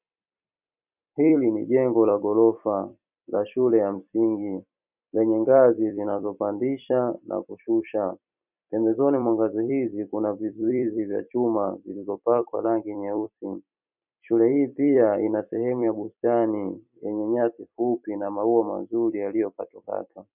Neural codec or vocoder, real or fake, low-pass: vocoder, 44.1 kHz, 128 mel bands every 256 samples, BigVGAN v2; fake; 3.6 kHz